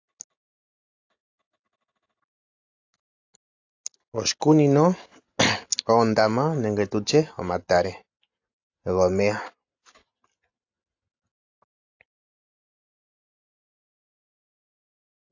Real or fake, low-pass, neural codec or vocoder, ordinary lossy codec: real; 7.2 kHz; none; AAC, 48 kbps